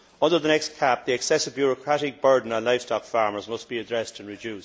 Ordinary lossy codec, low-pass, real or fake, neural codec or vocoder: none; none; real; none